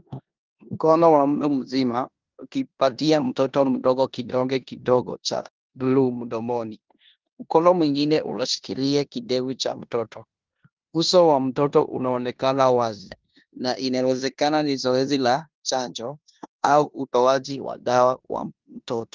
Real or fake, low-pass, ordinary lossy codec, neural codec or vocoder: fake; 7.2 kHz; Opus, 32 kbps; codec, 16 kHz in and 24 kHz out, 0.9 kbps, LongCat-Audio-Codec, four codebook decoder